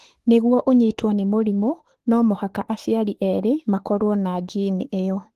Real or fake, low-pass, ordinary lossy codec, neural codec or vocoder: fake; 14.4 kHz; Opus, 16 kbps; autoencoder, 48 kHz, 32 numbers a frame, DAC-VAE, trained on Japanese speech